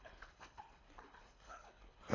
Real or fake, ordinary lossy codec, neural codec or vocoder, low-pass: fake; AAC, 32 kbps; codec, 24 kHz, 3 kbps, HILCodec; 7.2 kHz